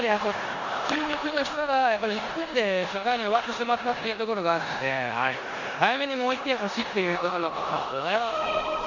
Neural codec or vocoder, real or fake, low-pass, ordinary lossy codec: codec, 16 kHz in and 24 kHz out, 0.9 kbps, LongCat-Audio-Codec, four codebook decoder; fake; 7.2 kHz; none